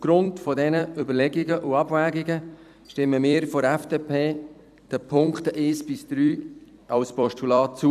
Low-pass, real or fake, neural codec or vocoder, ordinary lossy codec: 14.4 kHz; fake; vocoder, 44.1 kHz, 128 mel bands every 256 samples, BigVGAN v2; none